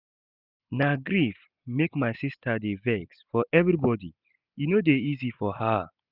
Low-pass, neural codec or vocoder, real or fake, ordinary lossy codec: 5.4 kHz; none; real; none